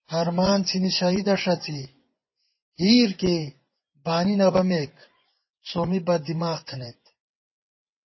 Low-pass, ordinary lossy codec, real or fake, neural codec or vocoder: 7.2 kHz; MP3, 24 kbps; fake; vocoder, 24 kHz, 100 mel bands, Vocos